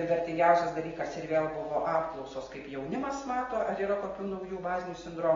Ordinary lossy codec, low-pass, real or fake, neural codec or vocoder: AAC, 24 kbps; 7.2 kHz; real; none